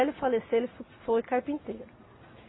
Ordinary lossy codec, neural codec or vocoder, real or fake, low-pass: AAC, 16 kbps; none; real; 7.2 kHz